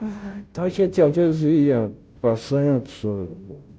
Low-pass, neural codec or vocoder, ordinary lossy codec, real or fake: none; codec, 16 kHz, 0.5 kbps, FunCodec, trained on Chinese and English, 25 frames a second; none; fake